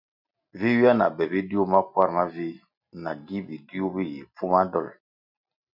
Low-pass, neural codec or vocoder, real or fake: 5.4 kHz; none; real